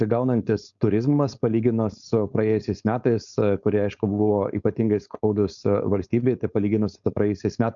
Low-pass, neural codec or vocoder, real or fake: 7.2 kHz; codec, 16 kHz, 4.8 kbps, FACodec; fake